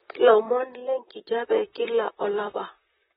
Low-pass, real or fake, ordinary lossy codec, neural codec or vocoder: 19.8 kHz; fake; AAC, 16 kbps; vocoder, 44.1 kHz, 128 mel bands, Pupu-Vocoder